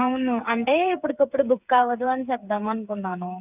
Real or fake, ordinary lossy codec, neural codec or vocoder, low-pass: fake; none; codec, 16 kHz, 8 kbps, FreqCodec, smaller model; 3.6 kHz